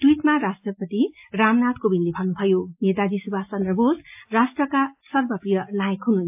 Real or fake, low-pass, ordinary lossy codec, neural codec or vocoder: real; 3.6 kHz; AAC, 32 kbps; none